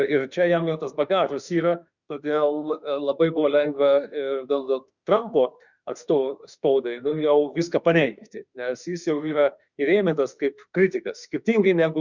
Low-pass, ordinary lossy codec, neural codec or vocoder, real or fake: 7.2 kHz; Opus, 64 kbps; autoencoder, 48 kHz, 32 numbers a frame, DAC-VAE, trained on Japanese speech; fake